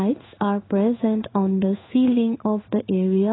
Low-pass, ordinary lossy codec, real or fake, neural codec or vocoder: 7.2 kHz; AAC, 16 kbps; fake; codec, 16 kHz in and 24 kHz out, 1 kbps, XY-Tokenizer